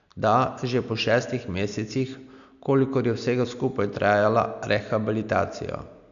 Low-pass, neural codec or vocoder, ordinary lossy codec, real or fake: 7.2 kHz; none; none; real